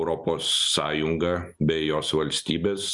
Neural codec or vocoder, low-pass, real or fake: none; 10.8 kHz; real